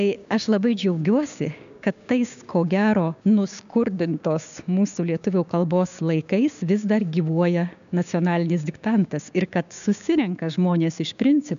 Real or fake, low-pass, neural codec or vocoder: fake; 7.2 kHz; codec, 16 kHz, 6 kbps, DAC